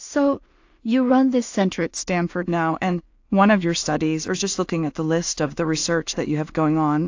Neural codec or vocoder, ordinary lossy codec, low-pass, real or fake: codec, 16 kHz in and 24 kHz out, 0.4 kbps, LongCat-Audio-Codec, two codebook decoder; AAC, 48 kbps; 7.2 kHz; fake